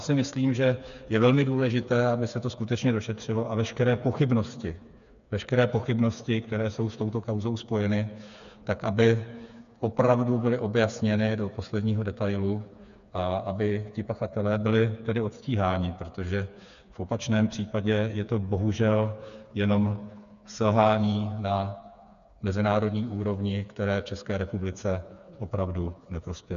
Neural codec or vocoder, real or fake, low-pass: codec, 16 kHz, 4 kbps, FreqCodec, smaller model; fake; 7.2 kHz